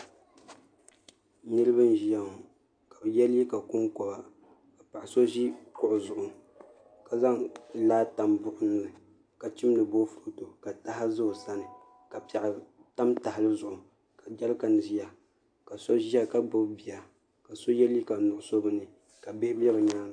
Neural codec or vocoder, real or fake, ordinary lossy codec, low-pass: none; real; AAC, 64 kbps; 9.9 kHz